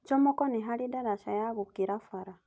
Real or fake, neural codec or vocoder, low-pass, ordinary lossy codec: real; none; none; none